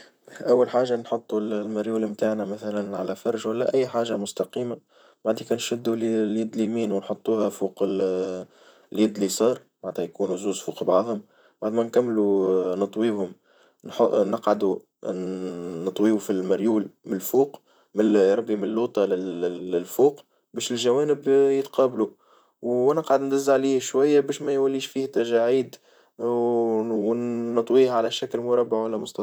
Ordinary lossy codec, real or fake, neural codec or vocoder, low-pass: none; fake; vocoder, 44.1 kHz, 128 mel bands, Pupu-Vocoder; none